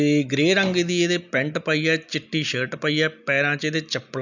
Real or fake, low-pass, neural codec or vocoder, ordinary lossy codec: real; 7.2 kHz; none; none